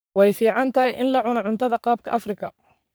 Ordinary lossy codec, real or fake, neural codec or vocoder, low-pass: none; fake; codec, 44.1 kHz, 3.4 kbps, Pupu-Codec; none